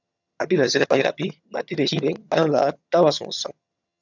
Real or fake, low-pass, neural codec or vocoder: fake; 7.2 kHz; vocoder, 22.05 kHz, 80 mel bands, HiFi-GAN